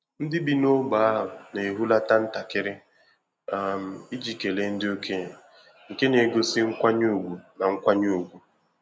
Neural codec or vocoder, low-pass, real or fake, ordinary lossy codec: none; none; real; none